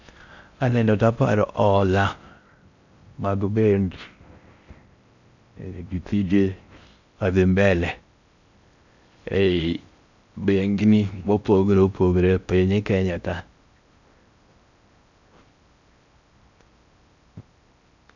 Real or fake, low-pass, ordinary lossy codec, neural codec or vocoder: fake; 7.2 kHz; none; codec, 16 kHz in and 24 kHz out, 0.6 kbps, FocalCodec, streaming, 4096 codes